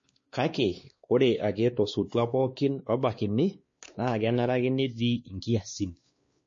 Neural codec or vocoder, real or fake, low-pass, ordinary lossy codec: codec, 16 kHz, 2 kbps, X-Codec, WavLM features, trained on Multilingual LibriSpeech; fake; 7.2 kHz; MP3, 32 kbps